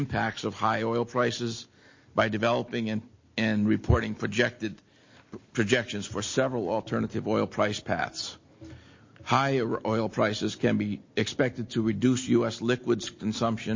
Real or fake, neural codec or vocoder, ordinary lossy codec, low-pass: real; none; MP3, 32 kbps; 7.2 kHz